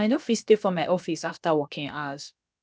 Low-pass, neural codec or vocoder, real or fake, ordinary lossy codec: none; codec, 16 kHz, about 1 kbps, DyCAST, with the encoder's durations; fake; none